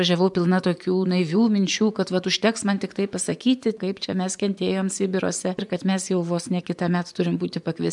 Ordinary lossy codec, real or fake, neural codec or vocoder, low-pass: MP3, 96 kbps; fake; vocoder, 24 kHz, 100 mel bands, Vocos; 10.8 kHz